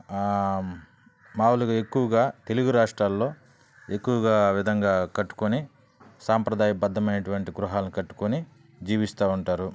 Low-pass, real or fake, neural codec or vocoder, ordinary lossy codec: none; real; none; none